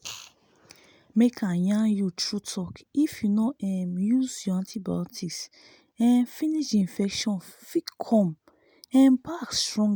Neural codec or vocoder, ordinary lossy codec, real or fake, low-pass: none; none; real; none